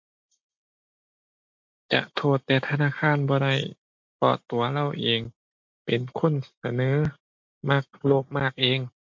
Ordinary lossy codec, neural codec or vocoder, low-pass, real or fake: MP3, 48 kbps; none; 7.2 kHz; real